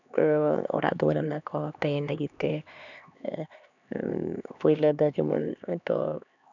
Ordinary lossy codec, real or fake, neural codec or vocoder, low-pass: none; fake; codec, 16 kHz, 2 kbps, X-Codec, HuBERT features, trained on LibriSpeech; 7.2 kHz